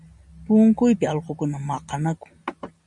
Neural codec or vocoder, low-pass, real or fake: none; 10.8 kHz; real